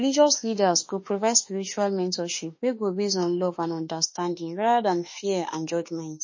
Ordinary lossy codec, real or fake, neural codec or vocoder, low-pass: MP3, 32 kbps; fake; autoencoder, 48 kHz, 32 numbers a frame, DAC-VAE, trained on Japanese speech; 7.2 kHz